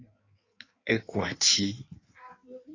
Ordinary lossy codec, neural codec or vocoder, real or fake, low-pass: AAC, 32 kbps; codec, 16 kHz in and 24 kHz out, 1.1 kbps, FireRedTTS-2 codec; fake; 7.2 kHz